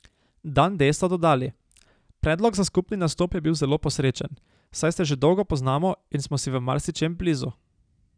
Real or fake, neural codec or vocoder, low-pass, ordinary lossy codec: real; none; 9.9 kHz; none